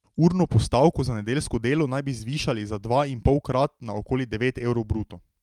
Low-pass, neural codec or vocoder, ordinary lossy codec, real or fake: 19.8 kHz; none; Opus, 32 kbps; real